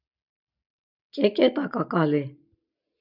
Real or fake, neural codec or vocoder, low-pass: real; none; 5.4 kHz